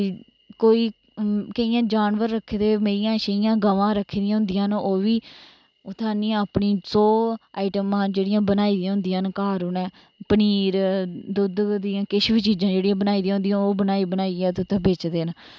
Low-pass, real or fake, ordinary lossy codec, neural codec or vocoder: none; real; none; none